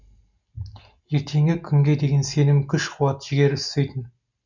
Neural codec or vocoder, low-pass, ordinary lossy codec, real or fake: none; 7.2 kHz; none; real